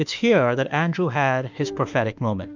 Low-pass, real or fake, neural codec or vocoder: 7.2 kHz; fake; autoencoder, 48 kHz, 32 numbers a frame, DAC-VAE, trained on Japanese speech